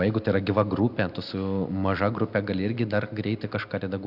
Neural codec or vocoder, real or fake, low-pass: none; real; 5.4 kHz